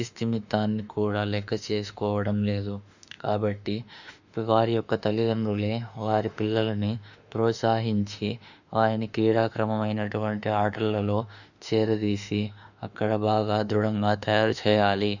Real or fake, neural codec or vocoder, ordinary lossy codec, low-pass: fake; autoencoder, 48 kHz, 32 numbers a frame, DAC-VAE, trained on Japanese speech; none; 7.2 kHz